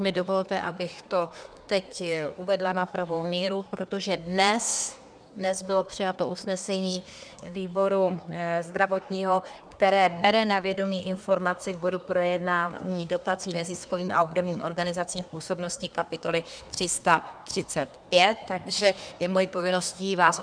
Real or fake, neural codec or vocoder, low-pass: fake; codec, 24 kHz, 1 kbps, SNAC; 9.9 kHz